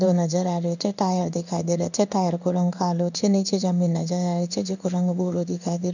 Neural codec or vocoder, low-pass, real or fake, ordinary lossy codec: codec, 16 kHz in and 24 kHz out, 1 kbps, XY-Tokenizer; 7.2 kHz; fake; none